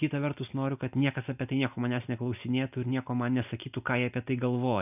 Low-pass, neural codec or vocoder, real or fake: 3.6 kHz; none; real